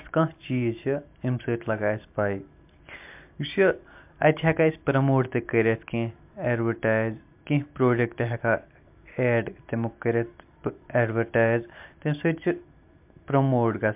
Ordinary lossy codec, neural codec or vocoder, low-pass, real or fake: MP3, 32 kbps; none; 3.6 kHz; real